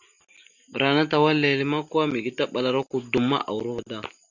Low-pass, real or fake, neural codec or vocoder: 7.2 kHz; real; none